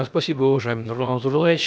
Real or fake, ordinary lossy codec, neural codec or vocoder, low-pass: fake; none; codec, 16 kHz, about 1 kbps, DyCAST, with the encoder's durations; none